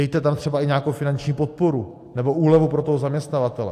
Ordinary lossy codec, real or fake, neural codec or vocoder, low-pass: AAC, 64 kbps; real; none; 14.4 kHz